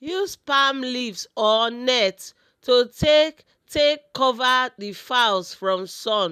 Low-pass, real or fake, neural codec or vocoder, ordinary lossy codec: 14.4 kHz; real; none; none